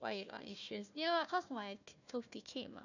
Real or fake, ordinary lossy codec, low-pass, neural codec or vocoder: fake; none; 7.2 kHz; codec, 16 kHz, 1 kbps, FunCodec, trained on Chinese and English, 50 frames a second